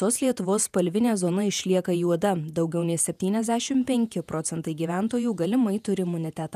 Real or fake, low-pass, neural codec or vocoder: fake; 14.4 kHz; vocoder, 48 kHz, 128 mel bands, Vocos